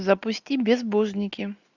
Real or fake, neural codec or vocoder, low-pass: real; none; 7.2 kHz